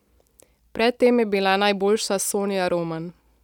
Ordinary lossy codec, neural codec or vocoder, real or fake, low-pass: none; none; real; 19.8 kHz